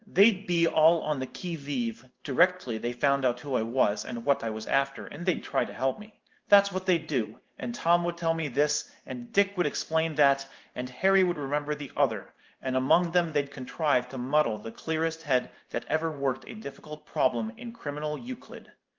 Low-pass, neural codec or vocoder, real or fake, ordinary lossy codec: 7.2 kHz; codec, 16 kHz in and 24 kHz out, 1 kbps, XY-Tokenizer; fake; Opus, 24 kbps